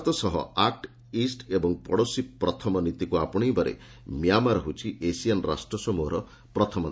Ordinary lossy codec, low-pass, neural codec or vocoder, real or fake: none; none; none; real